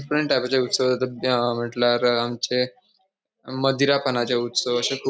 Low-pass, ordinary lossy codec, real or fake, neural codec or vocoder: none; none; real; none